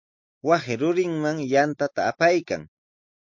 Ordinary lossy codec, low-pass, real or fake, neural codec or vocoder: MP3, 48 kbps; 7.2 kHz; real; none